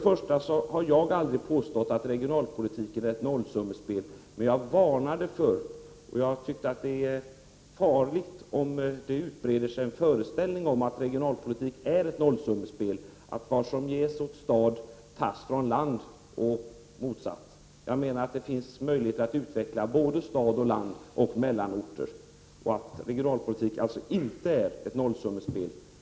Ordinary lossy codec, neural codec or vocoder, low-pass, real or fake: none; none; none; real